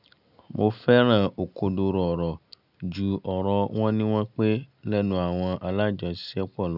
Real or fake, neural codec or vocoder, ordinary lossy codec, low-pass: real; none; none; 5.4 kHz